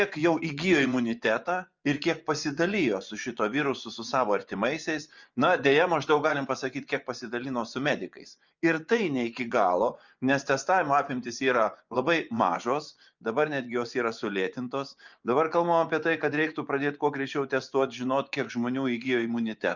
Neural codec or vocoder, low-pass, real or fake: vocoder, 24 kHz, 100 mel bands, Vocos; 7.2 kHz; fake